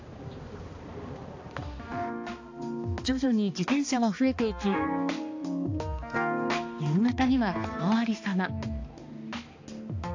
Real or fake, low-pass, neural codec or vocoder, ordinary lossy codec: fake; 7.2 kHz; codec, 16 kHz, 2 kbps, X-Codec, HuBERT features, trained on balanced general audio; AAC, 48 kbps